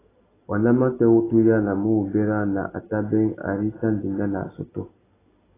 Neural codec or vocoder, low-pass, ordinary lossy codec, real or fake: none; 3.6 kHz; AAC, 16 kbps; real